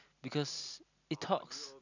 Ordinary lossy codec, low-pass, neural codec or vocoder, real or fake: none; 7.2 kHz; none; real